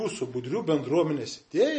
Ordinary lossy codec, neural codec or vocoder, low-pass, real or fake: MP3, 32 kbps; none; 10.8 kHz; real